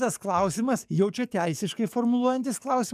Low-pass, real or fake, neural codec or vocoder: 14.4 kHz; fake; codec, 44.1 kHz, 7.8 kbps, DAC